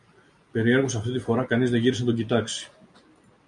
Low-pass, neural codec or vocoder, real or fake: 10.8 kHz; none; real